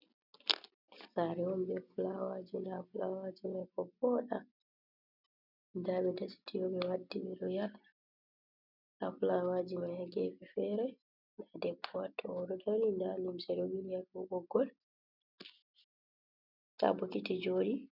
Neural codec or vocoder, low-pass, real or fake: none; 5.4 kHz; real